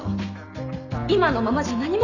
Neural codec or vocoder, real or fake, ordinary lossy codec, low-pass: none; real; none; 7.2 kHz